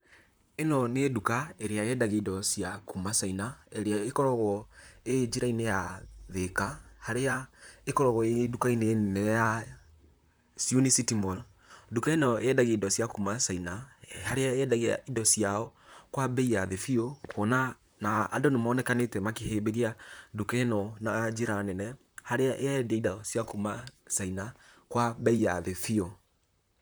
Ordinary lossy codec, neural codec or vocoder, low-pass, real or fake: none; vocoder, 44.1 kHz, 128 mel bands, Pupu-Vocoder; none; fake